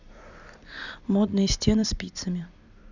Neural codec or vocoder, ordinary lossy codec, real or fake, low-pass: vocoder, 44.1 kHz, 128 mel bands every 256 samples, BigVGAN v2; none; fake; 7.2 kHz